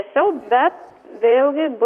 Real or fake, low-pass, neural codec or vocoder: fake; 14.4 kHz; vocoder, 44.1 kHz, 128 mel bands, Pupu-Vocoder